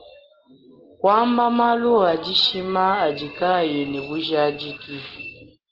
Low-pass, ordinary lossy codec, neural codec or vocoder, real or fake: 5.4 kHz; Opus, 16 kbps; none; real